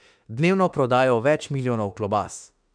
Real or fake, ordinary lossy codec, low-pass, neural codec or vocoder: fake; none; 9.9 kHz; autoencoder, 48 kHz, 32 numbers a frame, DAC-VAE, trained on Japanese speech